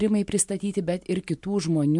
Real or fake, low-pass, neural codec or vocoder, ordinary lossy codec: real; 10.8 kHz; none; MP3, 64 kbps